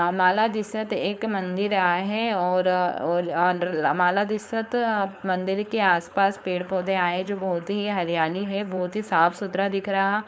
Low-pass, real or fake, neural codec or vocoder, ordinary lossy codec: none; fake; codec, 16 kHz, 4.8 kbps, FACodec; none